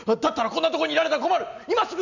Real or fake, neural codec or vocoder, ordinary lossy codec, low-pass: real; none; none; 7.2 kHz